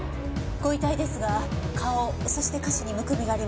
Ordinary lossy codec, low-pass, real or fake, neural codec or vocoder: none; none; real; none